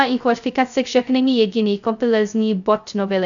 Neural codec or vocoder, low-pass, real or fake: codec, 16 kHz, 0.2 kbps, FocalCodec; 7.2 kHz; fake